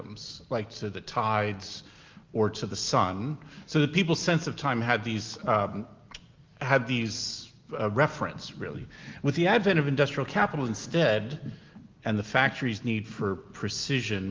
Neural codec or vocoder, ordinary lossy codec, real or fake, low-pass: none; Opus, 32 kbps; real; 7.2 kHz